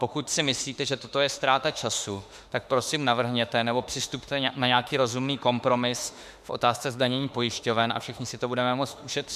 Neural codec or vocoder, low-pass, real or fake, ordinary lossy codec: autoencoder, 48 kHz, 32 numbers a frame, DAC-VAE, trained on Japanese speech; 14.4 kHz; fake; MP3, 96 kbps